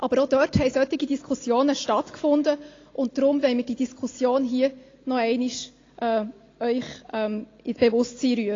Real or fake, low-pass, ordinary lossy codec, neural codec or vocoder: real; 7.2 kHz; AAC, 32 kbps; none